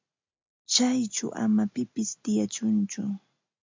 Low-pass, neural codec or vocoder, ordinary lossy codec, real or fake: 7.2 kHz; none; MP3, 64 kbps; real